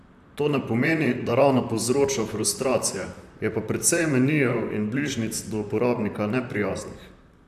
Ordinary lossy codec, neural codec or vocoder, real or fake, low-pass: AAC, 96 kbps; vocoder, 44.1 kHz, 128 mel bands, Pupu-Vocoder; fake; 14.4 kHz